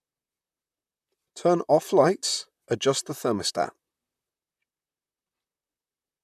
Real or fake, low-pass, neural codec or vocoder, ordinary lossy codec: fake; 14.4 kHz; vocoder, 44.1 kHz, 128 mel bands, Pupu-Vocoder; none